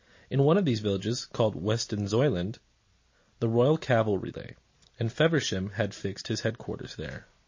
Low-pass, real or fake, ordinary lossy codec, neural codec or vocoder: 7.2 kHz; real; MP3, 32 kbps; none